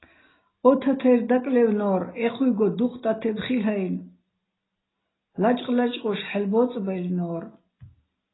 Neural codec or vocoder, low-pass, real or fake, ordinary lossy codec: none; 7.2 kHz; real; AAC, 16 kbps